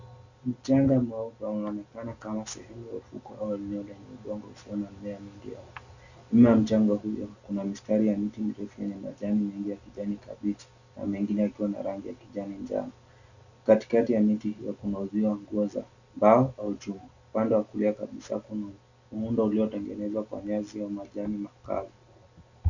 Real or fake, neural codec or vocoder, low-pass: real; none; 7.2 kHz